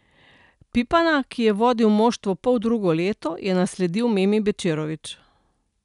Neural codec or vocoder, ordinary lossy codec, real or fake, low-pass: none; none; real; 10.8 kHz